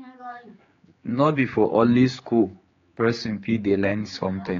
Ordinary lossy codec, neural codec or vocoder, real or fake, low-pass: AAC, 32 kbps; codec, 16 kHz, 4 kbps, X-Codec, HuBERT features, trained on general audio; fake; 7.2 kHz